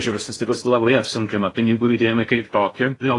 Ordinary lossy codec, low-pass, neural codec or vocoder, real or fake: AAC, 32 kbps; 10.8 kHz; codec, 16 kHz in and 24 kHz out, 0.6 kbps, FocalCodec, streaming, 4096 codes; fake